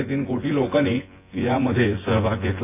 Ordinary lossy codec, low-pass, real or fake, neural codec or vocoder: AAC, 24 kbps; 3.6 kHz; fake; vocoder, 24 kHz, 100 mel bands, Vocos